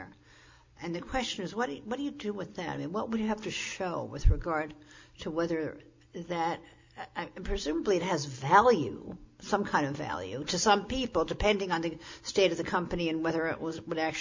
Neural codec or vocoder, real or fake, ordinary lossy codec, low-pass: none; real; MP3, 48 kbps; 7.2 kHz